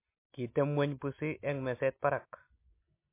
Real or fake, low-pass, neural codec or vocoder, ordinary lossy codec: real; 3.6 kHz; none; MP3, 24 kbps